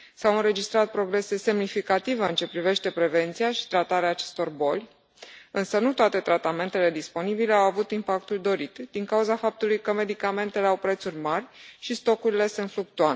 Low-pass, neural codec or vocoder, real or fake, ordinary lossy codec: none; none; real; none